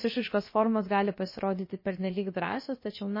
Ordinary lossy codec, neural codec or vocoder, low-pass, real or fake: MP3, 24 kbps; codec, 16 kHz, about 1 kbps, DyCAST, with the encoder's durations; 5.4 kHz; fake